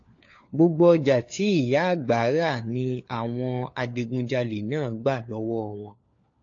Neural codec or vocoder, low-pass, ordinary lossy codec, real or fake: codec, 16 kHz, 4 kbps, FunCodec, trained on LibriTTS, 50 frames a second; 7.2 kHz; AAC, 48 kbps; fake